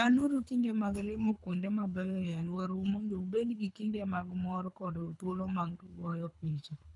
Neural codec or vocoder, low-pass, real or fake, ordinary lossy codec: codec, 24 kHz, 3 kbps, HILCodec; 10.8 kHz; fake; none